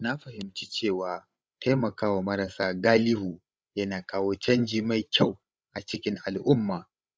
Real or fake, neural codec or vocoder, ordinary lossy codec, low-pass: fake; codec, 16 kHz, 16 kbps, FreqCodec, larger model; none; none